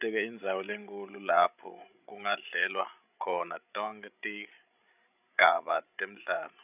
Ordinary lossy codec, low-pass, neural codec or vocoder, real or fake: none; 3.6 kHz; codec, 16 kHz, 16 kbps, FreqCodec, larger model; fake